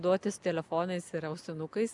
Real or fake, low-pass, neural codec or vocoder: real; 10.8 kHz; none